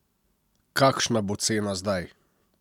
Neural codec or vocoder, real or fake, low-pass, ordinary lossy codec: none; real; 19.8 kHz; none